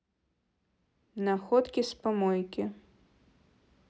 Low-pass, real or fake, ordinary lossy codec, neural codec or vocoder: none; real; none; none